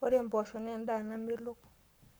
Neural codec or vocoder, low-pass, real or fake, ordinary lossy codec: codec, 44.1 kHz, 7.8 kbps, Pupu-Codec; none; fake; none